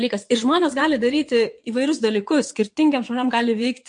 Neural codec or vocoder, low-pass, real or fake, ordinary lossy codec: vocoder, 22.05 kHz, 80 mel bands, WaveNeXt; 9.9 kHz; fake; MP3, 48 kbps